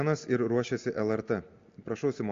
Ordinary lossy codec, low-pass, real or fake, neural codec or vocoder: AAC, 48 kbps; 7.2 kHz; real; none